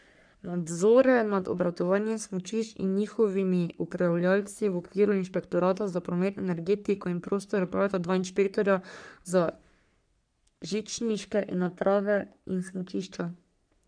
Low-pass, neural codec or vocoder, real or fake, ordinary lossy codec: 9.9 kHz; codec, 44.1 kHz, 3.4 kbps, Pupu-Codec; fake; none